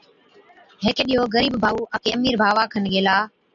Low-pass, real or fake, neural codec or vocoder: 7.2 kHz; real; none